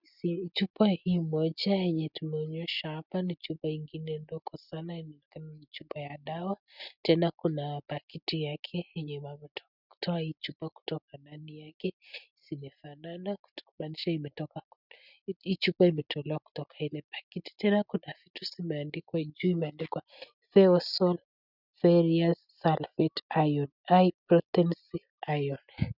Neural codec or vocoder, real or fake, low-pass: none; real; 5.4 kHz